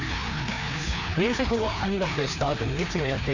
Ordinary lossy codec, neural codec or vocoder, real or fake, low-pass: none; codec, 16 kHz, 2 kbps, FreqCodec, larger model; fake; 7.2 kHz